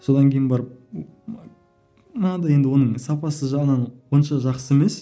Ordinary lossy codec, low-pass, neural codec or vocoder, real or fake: none; none; none; real